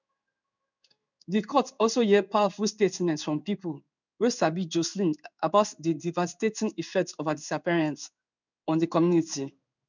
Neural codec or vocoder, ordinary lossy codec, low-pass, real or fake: codec, 16 kHz in and 24 kHz out, 1 kbps, XY-Tokenizer; none; 7.2 kHz; fake